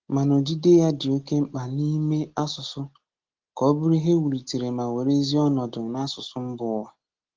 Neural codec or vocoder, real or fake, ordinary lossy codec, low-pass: none; real; Opus, 16 kbps; 7.2 kHz